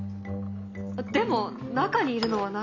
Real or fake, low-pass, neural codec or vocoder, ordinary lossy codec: real; 7.2 kHz; none; none